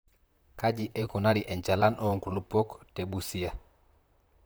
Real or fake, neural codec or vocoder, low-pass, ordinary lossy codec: fake; vocoder, 44.1 kHz, 128 mel bands, Pupu-Vocoder; none; none